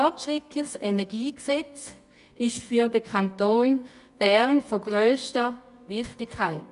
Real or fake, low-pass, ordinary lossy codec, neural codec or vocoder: fake; 10.8 kHz; none; codec, 24 kHz, 0.9 kbps, WavTokenizer, medium music audio release